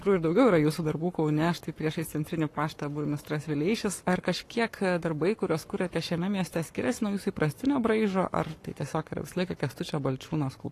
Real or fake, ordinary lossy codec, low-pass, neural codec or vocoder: fake; AAC, 48 kbps; 14.4 kHz; codec, 44.1 kHz, 7.8 kbps, Pupu-Codec